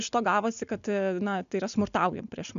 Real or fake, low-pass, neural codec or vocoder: real; 7.2 kHz; none